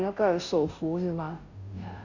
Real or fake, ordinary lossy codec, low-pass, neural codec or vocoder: fake; none; 7.2 kHz; codec, 16 kHz, 0.5 kbps, FunCodec, trained on Chinese and English, 25 frames a second